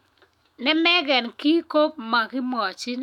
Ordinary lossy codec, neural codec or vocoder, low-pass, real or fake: none; autoencoder, 48 kHz, 128 numbers a frame, DAC-VAE, trained on Japanese speech; 19.8 kHz; fake